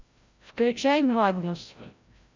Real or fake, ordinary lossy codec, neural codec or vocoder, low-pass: fake; MP3, 64 kbps; codec, 16 kHz, 0.5 kbps, FreqCodec, larger model; 7.2 kHz